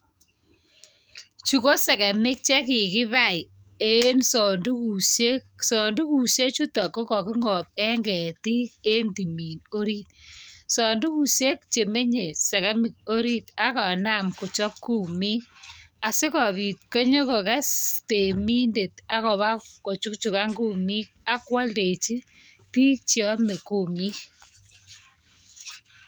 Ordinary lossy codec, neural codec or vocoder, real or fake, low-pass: none; codec, 44.1 kHz, 7.8 kbps, DAC; fake; none